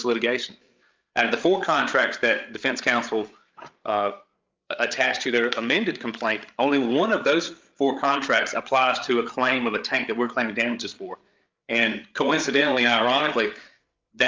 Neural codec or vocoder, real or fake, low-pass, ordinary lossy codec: codec, 16 kHz, 8 kbps, FreqCodec, larger model; fake; 7.2 kHz; Opus, 24 kbps